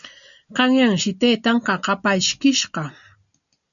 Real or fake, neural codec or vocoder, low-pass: real; none; 7.2 kHz